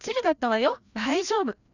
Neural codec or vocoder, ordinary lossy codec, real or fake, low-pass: codec, 16 kHz, 1 kbps, FreqCodec, larger model; none; fake; 7.2 kHz